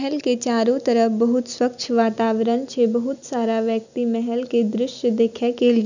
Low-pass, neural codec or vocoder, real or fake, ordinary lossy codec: 7.2 kHz; none; real; none